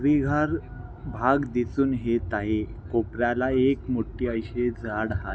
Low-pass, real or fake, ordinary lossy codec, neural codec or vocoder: none; real; none; none